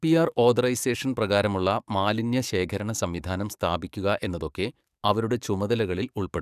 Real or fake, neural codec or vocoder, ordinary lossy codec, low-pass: fake; codec, 44.1 kHz, 7.8 kbps, DAC; none; 14.4 kHz